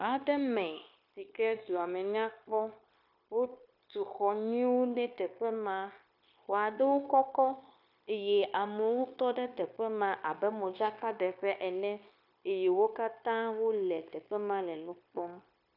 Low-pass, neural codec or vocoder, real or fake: 5.4 kHz; codec, 16 kHz, 0.9 kbps, LongCat-Audio-Codec; fake